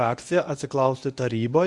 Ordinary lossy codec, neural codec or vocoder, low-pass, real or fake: Opus, 64 kbps; codec, 24 kHz, 0.9 kbps, WavTokenizer, medium speech release version 1; 10.8 kHz; fake